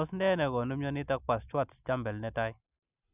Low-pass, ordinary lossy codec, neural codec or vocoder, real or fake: 3.6 kHz; none; none; real